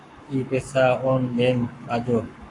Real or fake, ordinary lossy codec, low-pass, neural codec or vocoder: fake; AAC, 48 kbps; 10.8 kHz; codec, 44.1 kHz, 7.8 kbps, Pupu-Codec